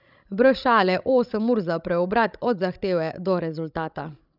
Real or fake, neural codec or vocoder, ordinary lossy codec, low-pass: fake; codec, 16 kHz, 16 kbps, FreqCodec, larger model; none; 5.4 kHz